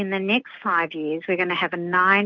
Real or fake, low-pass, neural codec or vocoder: real; 7.2 kHz; none